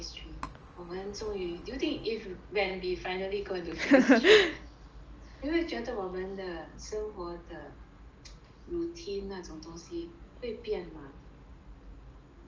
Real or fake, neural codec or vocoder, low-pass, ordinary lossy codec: real; none; 7.2 kHz; Opus, 24 kbps